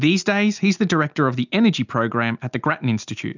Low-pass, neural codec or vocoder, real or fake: 7.2 kHz; none; real